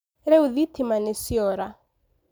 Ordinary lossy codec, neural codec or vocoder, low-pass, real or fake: none; none; none; real